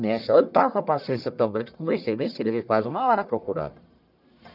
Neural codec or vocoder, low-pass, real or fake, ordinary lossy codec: codec, 44.1 kHz, 1.7 kbps, Pupu-Codec; 5.4 kHz; fake; none